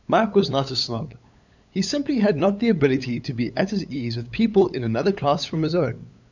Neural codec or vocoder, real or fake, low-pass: codec, 16 kHz, 8 kbps, FunCodec, trained on LibriTTS, 25 frames a second; fake; 7.2 kHz